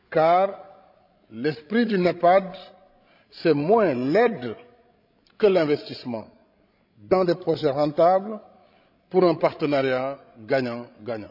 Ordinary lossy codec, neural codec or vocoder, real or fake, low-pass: none; codec, 16 kHz, 16 kbps, FreqCodec, larger model; fake; 5.4 kHz